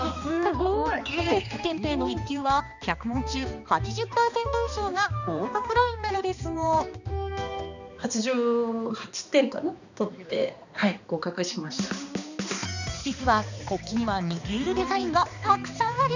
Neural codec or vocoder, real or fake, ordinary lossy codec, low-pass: codec, 16 kHz, 2 kbps, X-Codec, HuBERT features, trained on balanced general audio; fake; none; 7.2 kHz